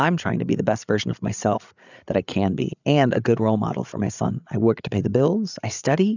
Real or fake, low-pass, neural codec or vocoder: fake; 7.2 kHz; codec, 16 kHz, 8 kbps, FreqCodec, larger model